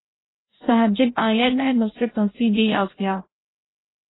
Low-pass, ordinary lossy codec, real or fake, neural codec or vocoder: 7.2 kHz; AAC, 16 kbps; fake; codec, 16 kHz, 0.5 kbps, FreqCodec, larger model